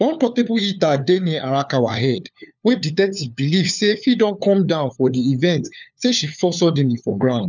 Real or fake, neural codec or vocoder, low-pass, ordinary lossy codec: fake; codec, 16 kHz, 4 kbps, FunCodec, trained on LibriTTS, 50 frames a second; 7.2 kHz; none